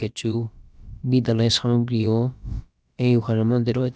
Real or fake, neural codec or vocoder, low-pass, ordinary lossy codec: fake; codec, 16 kHz, about 1 kbps, DyCAST, with the encoder's durations; none; none